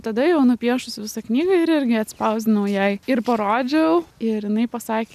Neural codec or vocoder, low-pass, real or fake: none; 14.4 kHz; real